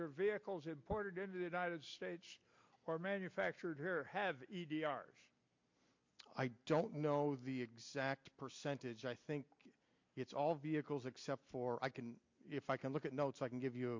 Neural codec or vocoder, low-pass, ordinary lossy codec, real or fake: none; 7.2 kHz; MP3, 48 kbps; real